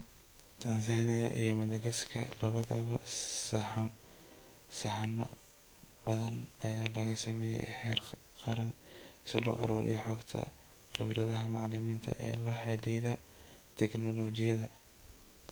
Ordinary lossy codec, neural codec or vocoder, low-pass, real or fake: none; codec, 44.1 kHz, 2.6 kbps, SNAC; none; fake